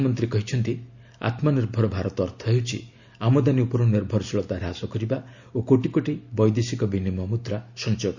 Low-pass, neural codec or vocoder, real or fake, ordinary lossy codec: 7.2 kHz; none; real; Opus, 64 kbps